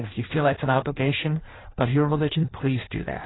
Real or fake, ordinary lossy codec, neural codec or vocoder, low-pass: fake; AAC, 16 kbps; codec, 16 kHz in and 24 kHz out, 0.6 kbps, FireRedTTS-2 codec; 7.2 kHz